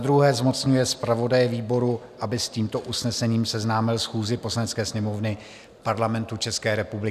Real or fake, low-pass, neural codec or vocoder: real; 14.4 kHz; none